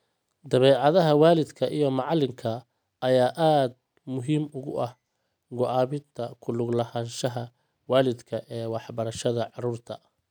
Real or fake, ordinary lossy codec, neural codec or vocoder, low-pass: real; none; none; none